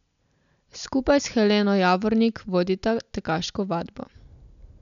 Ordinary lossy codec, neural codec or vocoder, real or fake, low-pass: none; none; real; 7.2 kHz